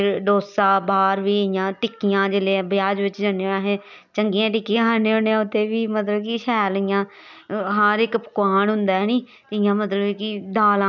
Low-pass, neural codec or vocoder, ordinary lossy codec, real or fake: 7.2 kHz; none; none; real